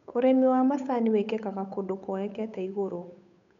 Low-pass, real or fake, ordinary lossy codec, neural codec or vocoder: 7.2 kHz; fake; none; codec, 16 kHz, 8 kbps, FunCodec, trained on Chinese and English, 25 frames a second